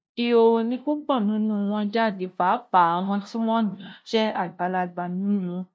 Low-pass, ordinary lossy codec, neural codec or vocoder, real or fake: none; none; codec, 16 kHz, 0.5 kbps, FunCodec, trained on LibriTTS, 25 frames a second; fake